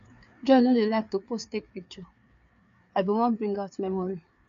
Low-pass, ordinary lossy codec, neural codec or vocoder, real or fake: 7.2 kHz; AAC, 96 kbps; codec, 16 kHz, 4 kbps, FreqCodec, larger model; fake